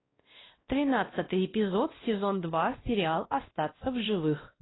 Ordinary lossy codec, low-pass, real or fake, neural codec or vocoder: AAC, 16 kbps; 7.2 kHz; fake; codec, 16 kHz, 1 kbps, X-Codec, WavLM features, trained on Multilingual LibriSpeech